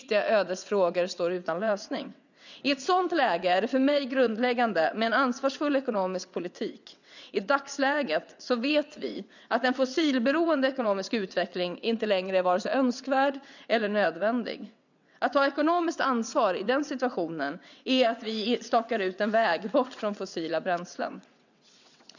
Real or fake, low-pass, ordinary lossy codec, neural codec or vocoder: fake; 7.2 kHz; none; vocoder, 22.05 kHz, 80 mel bands, WaveNeXt